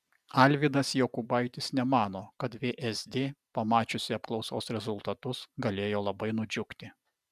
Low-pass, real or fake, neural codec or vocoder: 14.4 kHz; real; none